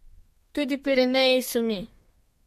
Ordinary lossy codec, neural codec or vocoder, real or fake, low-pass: MP3, 64 kbps; codec, 32 kHz, 1.9 kbps, SNAC; fake; 14.4 kHz